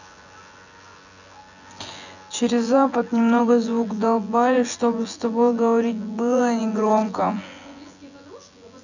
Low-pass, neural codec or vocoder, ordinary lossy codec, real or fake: 7.2 kHz; vocoder, 24 kHz, 100 mel bands, Vocos; none; fake